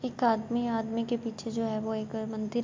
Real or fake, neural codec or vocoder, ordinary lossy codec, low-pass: real; none; MP3, 48 kbps; 7.2 kHz